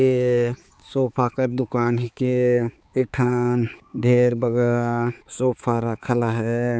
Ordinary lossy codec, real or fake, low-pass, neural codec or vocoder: none; fake; none; codec, 16 kHz, 4 kbps, X-Codec, HuBERT features, trained on balanced general audio